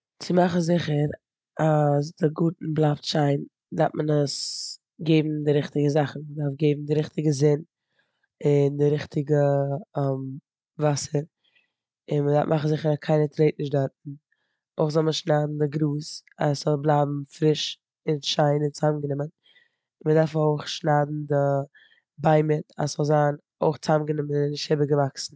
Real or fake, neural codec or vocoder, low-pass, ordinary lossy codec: real; none; none; none